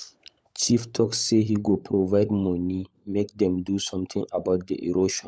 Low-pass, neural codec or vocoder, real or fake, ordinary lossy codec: none; codec, 16 kHz, 16 kbps, FunCodec, trained on LibriTTS, 50 frames a second; fake; none